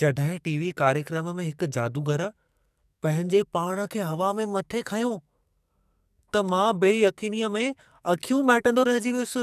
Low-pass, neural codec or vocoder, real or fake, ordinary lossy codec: 14.4 kHz; codec, 44.1 kHz, 2.6 kbps, SNAC; fake; none